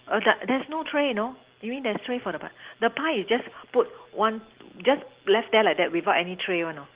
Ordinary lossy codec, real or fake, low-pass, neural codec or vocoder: Opus, 16 kbps; real; 3.6 kHz; none